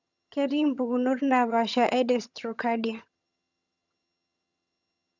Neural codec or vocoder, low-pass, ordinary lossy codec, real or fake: vocoder, 22.05 kHz, 80 mel bands, HiFi-GAN; 7.2 kHz; none; fake